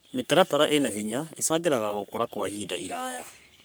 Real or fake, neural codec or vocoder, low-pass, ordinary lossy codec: fake; codec, 44.1 kHz, 3.4 kbps, Pupu-Codec; none; none